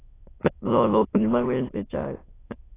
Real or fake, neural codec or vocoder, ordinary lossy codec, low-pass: fake; autoencoder, 22.05 kHz, a latent of 192 numbers a frame, VITS, trained on many speakers; AAC, 16 kbps; 3.6 kHz